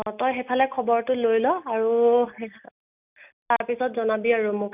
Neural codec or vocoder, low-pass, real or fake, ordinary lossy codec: none; 3.6 kHz; real; none